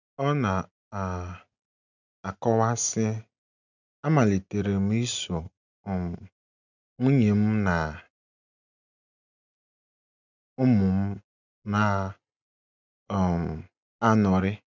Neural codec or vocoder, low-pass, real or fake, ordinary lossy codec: none; 7.2 kHz; real; none